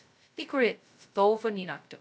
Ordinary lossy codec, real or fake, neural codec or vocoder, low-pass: none; fake; codec, 16 kHz, 0.2 kbps, FocalCodec; none